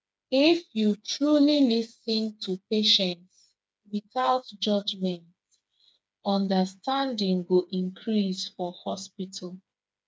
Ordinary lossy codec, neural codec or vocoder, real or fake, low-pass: none; codec, 16 kHz, 4 kbps, FreqCodec, smaller model; fake; none